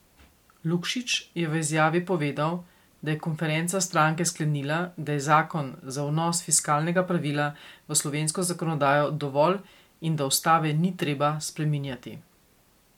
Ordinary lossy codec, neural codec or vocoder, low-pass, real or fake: MP3, 96 kbps; none; 19.8 kHz; real